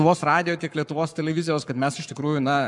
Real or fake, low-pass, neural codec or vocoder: fake; 10.8 kHz; codec, 44.1 kHz, 7.8 kbps, Pupu-Codec